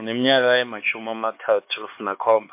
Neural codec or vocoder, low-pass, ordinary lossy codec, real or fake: codec, 16 kHz, 4 kbps, X-Codec, HuBERT features, trained on LibriSpeech; 3.6 kHz; MP3, 24 kbps; fake